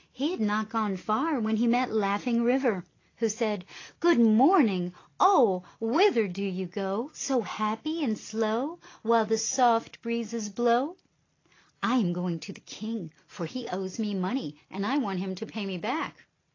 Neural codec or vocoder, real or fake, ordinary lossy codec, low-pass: none; real; AAC, 32 kbps; 7.2 kHz